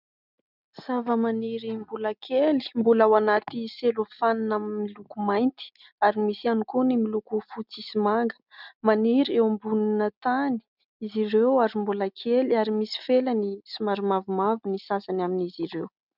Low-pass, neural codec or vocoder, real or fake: 5.4 kHz; none; real